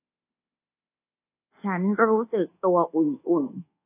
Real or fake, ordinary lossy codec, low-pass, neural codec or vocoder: fake; AAC, 24 kbps; 3.6 kHz; codec, 24 kHz, 1.2 kbps, DualCodec